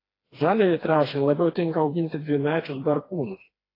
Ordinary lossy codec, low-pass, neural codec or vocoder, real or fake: AAC, 24 kbps; 5.4 kHz; codec, 16 kHz, 2 kbps, FreqCodec, smaller model; fake